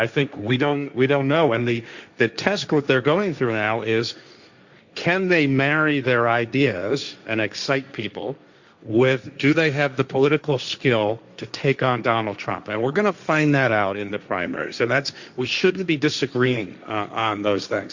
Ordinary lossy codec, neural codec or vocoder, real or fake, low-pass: Opus, 64 kbps; codec, 16 kHz, 1.1 kbps, Voila-Tokenizer; fake; 7.2 kHz